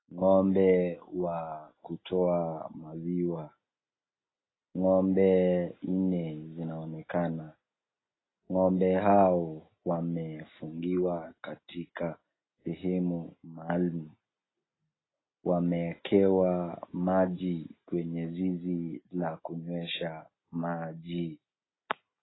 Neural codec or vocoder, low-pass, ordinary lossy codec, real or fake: none; 7.2 kHz; AAC, 16 kbps; real